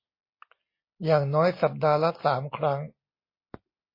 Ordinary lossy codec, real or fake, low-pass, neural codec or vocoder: MP3, 24 kbps; real; 5.4 kHz; none